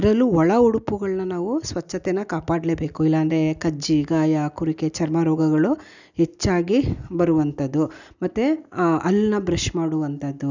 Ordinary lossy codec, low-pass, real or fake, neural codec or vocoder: none; 7.2 kHz; real; none